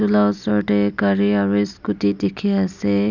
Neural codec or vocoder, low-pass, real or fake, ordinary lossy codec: none; 7.2 kHz; real; none